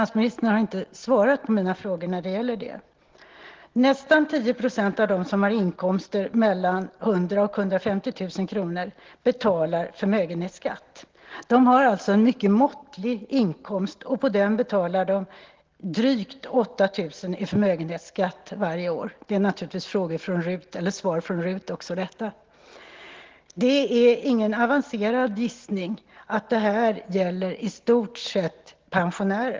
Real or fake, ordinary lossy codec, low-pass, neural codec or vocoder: real; Opus, 16 kbps; 7.2 kHz; none